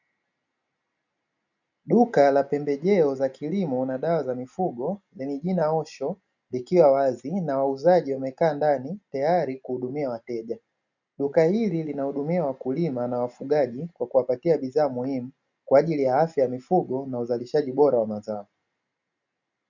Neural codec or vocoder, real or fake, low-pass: none; real; 7.2 kHz